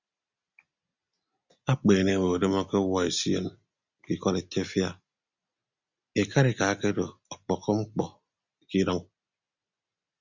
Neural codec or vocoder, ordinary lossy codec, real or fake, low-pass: none; Opus, 64 kbps; real; 7.2 kHz